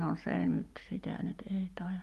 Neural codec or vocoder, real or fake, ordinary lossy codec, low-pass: none; real; Opus, 32 kbps; 14.4 kHz